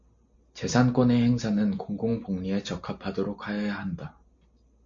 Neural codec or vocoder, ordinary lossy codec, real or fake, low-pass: none; MP3, 48 kbps; real; 7.2 kHz